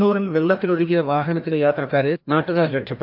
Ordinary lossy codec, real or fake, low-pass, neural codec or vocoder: MP3, 48 kbps; fake; 5.4 kHz; codec, 16 kHz, 1 kbps, FreqCodec, larger model